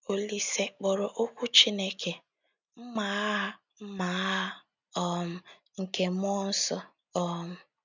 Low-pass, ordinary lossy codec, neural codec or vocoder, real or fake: 7.2 kHz; none; none; real